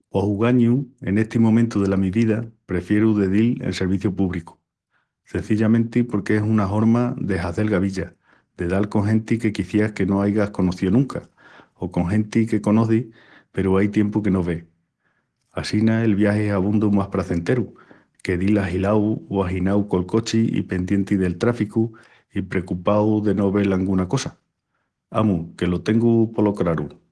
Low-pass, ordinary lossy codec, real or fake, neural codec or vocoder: 10.8 kHz; Opus, 16 kbps; real; none